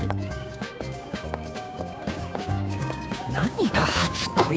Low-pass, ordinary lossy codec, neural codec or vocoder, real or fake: none; none; codec, 16 kHz, 6 kbps, DAC; fake